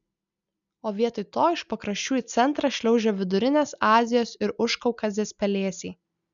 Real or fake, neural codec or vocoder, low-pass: real; none; 7.2 kHz